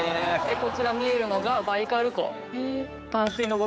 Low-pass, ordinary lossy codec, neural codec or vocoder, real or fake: none; none; codec, 16 kHz, 4 kbps, X-Codec, HuBERT features, trained on general audio; fake